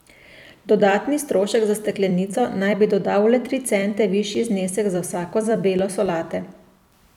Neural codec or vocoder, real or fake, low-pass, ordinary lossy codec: vocoder, 44.1 kHz, 128 mel bands every 256 samples, BigVGAN v2; fake; 19.8 kHz; none